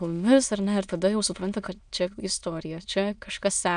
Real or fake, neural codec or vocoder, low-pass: fake; autoencoder, 22.05 kHz, a latent of 192 numbers a frame, VITS, trained on many speakers; 9.9 kHz